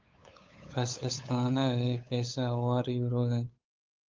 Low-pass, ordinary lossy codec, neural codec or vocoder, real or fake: 7.2 kHz; Opus, 32 kbps; codec, 16 kHz, 8 kbps, FunCodec, trained on LibriTTS, 25 frames a second; fake